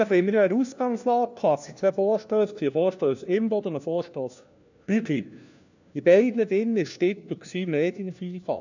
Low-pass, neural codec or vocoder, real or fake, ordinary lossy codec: 7.2 kHz; codec, 16 kHz, 1 kbps, FunCodec, trained on LibriTTS, 50 frames a second; fake; none